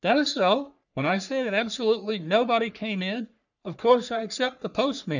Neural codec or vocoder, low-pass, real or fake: codec, 44.1 kHz, 3.4 kbps, Pupu-Codec; 7.2 kHz; fake